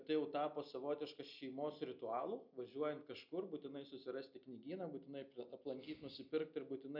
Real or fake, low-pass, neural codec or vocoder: real; 5.4 kHz; none